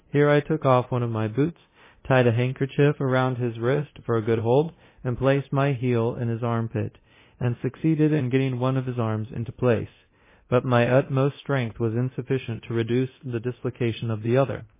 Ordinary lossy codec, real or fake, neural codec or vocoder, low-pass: MP3, 16 kbps; fake; codec, 24 kHz, 0.9 kbps, DualCodec; 3.6 kHz